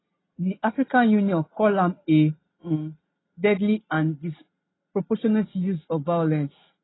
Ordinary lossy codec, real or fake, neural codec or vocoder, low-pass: AAC, 16 kbps; real; none; 7.2 kHz